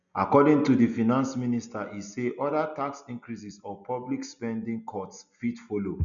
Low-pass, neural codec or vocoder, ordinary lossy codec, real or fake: 7.2 kHz; none; MP3, 96 kbps; real